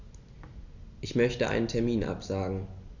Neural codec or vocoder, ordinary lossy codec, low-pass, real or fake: none; none; 7.2 kHz; real